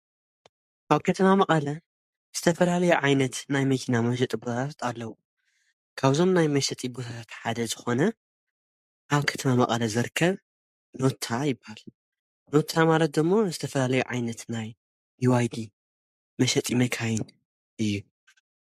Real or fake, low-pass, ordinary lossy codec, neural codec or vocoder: fake; 14.4 kHz; MP3, 64 kbps; codec, 44.1 kHz, 7.8 kbps, Pupu-Codec